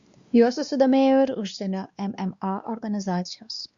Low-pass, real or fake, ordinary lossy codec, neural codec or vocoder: 7.2 kHz; fake; Opus, 64 kbps; codec, 16 kHz, 2 kbps, X-Codec, WavLM features, trained on Multilingual LibriSpeech